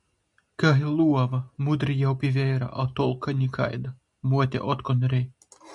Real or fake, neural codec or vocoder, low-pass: real; none; 10.8 kHz